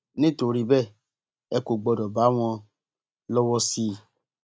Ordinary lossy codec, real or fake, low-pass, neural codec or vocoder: none; real; none; none